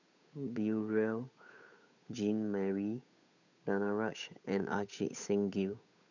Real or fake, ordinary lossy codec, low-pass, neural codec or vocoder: fake; none; 7.2 kHz; codec, 16 kHz, 8 kbps, FunCodec, trained on Chinese and English, 25 frames a second